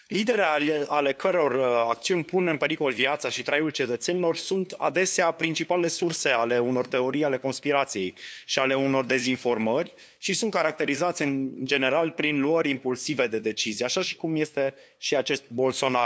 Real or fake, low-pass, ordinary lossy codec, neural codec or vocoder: fake; none; none; codec, 16 kHz, 2 kbps, FunCodec, trained on LibriTTS, 25 frames a second